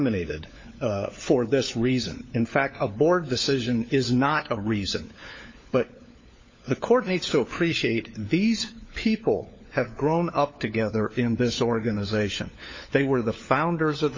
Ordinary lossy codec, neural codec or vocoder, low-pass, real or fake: MP3, 32 kbps; codec, 16 kHz, 4 kbps, FunCodec, trained on LibriTTS, 50 frames a second; 7.2 kHz; fake